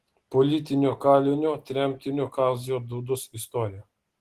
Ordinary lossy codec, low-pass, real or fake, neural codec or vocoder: Opus, 16 kbps; 14.4 kHz; real; none